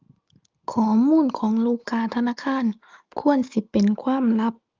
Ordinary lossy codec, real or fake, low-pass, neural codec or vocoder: Opus, 24 kbps; real; 7.2 kHz; none